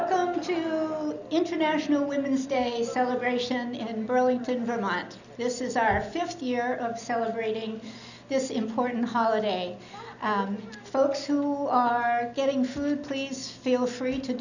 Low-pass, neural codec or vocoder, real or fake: 7.2 kHz; none; real